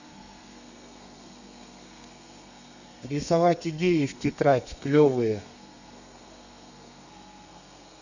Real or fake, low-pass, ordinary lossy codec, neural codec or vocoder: fake; 7.2 kHz; none; codec, 32 kHz, 1.9 kbps, SNAC